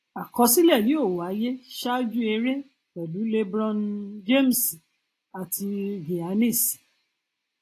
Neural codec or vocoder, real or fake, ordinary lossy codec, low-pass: none; real; AAC, 48 kbps; 14.4 kHz